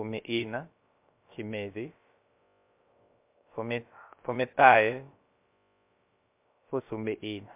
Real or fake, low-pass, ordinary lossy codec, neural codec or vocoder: fake; 3.6 kHz; MP3, 32 kbps; codec, 16 kHz, about 1 kbps, DyCAST, with the encoder's durations